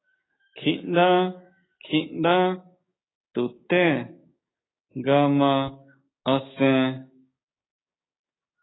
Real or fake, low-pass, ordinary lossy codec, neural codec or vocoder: fake; 7.2 kHz; AAC, 16 kbps; codec, 16 kHz, 6 kbps, DAC